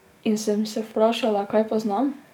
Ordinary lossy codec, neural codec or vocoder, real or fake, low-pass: none; codec, 44.1 kHz, 7.8 kbps, DAC; fake; 19.8 kHz